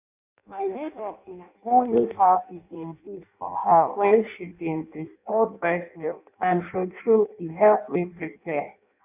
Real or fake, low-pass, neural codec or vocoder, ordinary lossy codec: fake; 3.6 kHz; codec, 16 kHz in and 24 kHz out, 0.6 kbps, FireRedTTS-2 codec; none